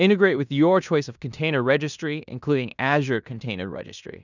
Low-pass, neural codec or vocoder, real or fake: 7.2 kHz; codec, 16 kHz in and 24 kHz out, 0.9 kbps, LongCat-Audio-Codec, fine tuned four codebook decoder; fake